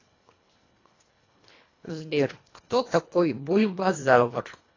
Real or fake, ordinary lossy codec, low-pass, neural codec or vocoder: fake; AAC, 32 kbps; 7.2 kHz; codec, 24 kHz, 1.5 kbps, HILCodec